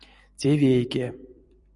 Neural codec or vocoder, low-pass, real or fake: vocoder, 44.1 kHz, 128 mel bands every 256 samples, BigVGAN v2; 10.8 kHz; fake